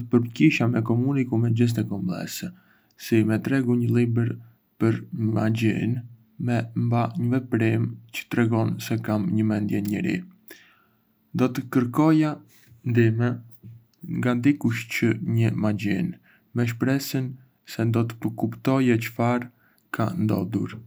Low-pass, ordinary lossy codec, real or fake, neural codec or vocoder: none; none; real; none